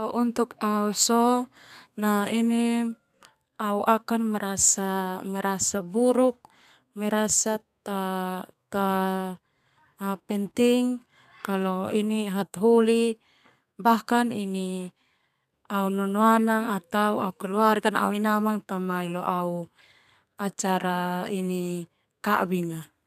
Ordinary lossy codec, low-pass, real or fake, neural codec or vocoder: none; 14.4 kHz; fake; codec, 32 kHz, 1.9 kbps, SNAC